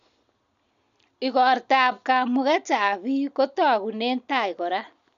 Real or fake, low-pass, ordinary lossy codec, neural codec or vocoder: real; 7.2 kHz; none; none